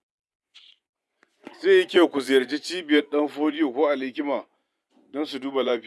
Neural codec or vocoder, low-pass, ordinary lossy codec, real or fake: vocoder, 24 kHz, 100 mel bands, Vocos; none; none; fake